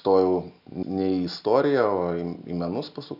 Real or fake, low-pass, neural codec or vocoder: real; 5.4 kHz; none